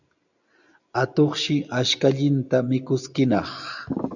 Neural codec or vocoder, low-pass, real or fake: none; 7.2 kHz; real